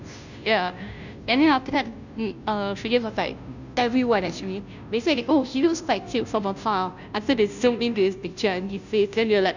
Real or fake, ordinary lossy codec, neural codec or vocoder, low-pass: fake; none; codec, 16 kHz, 0.5 kbps, FunCodec, trained on Chinese and English, 25 frames a second; 7.2 kHz